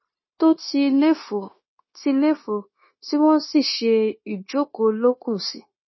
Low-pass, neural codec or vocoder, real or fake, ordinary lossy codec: 7.2 kHz; codec, 16 kHz, 0.9 kbps, LongCat-Audio-Codec; fake; MP3, 24 kbps